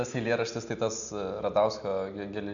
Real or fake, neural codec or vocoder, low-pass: real; none; 7.2 kHz